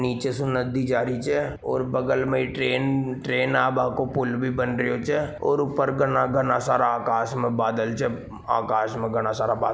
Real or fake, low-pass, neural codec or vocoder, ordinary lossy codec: real; none; none; none